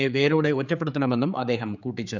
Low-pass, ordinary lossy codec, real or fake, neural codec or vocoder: 7.2 kHz; none; fake; codec, 16 kHz, 4 kbps, X-Codec, HuBERT features, trained on general audio